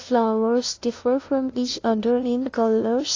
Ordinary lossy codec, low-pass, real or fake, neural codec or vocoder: AAC, 32 kbps; 7.2 kHz; fake; codec, 16 kHz, 0.5 kbps, FunCodec, trained on LibriTTS, 25 frames a second